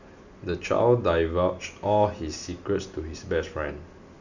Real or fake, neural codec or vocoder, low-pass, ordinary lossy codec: real; none; 7.2 kHz; none